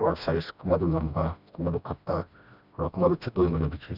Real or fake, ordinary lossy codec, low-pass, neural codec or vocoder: fake; none; 5.4 kHz; codec, 16 kHz, 1 kbps, FreqCodec, smaller model